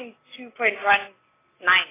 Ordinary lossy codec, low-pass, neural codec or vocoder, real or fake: AAC, 16 kbps; 3.6 kHz; none; real